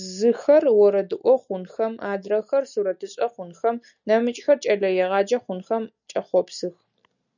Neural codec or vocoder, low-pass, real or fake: none; 7.2 kHz; real